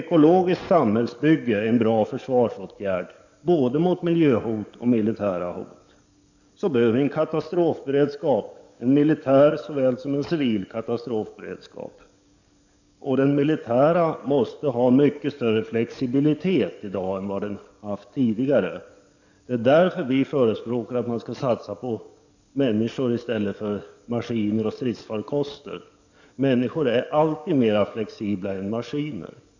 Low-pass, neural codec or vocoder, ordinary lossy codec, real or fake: 7.2 kHz; codec, 44.1 kHz, 7.8 kbps, DAC; none; fake